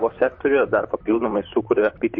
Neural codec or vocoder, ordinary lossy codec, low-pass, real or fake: vocoder, 44.1 kHz, 128 mel bands, Pupu-Vocoder; MP3, 32 kbps; 7.2 kHz; fake